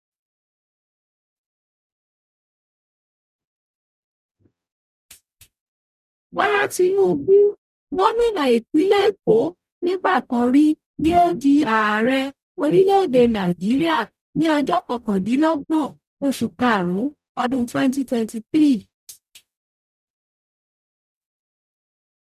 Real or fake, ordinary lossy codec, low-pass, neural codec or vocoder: fake; none; 14.4 kHz; codec, 44.1 kHz, 0.9 kbps, DAC